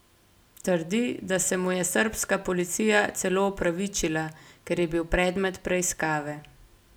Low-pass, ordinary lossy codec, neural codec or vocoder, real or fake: none; none; none; real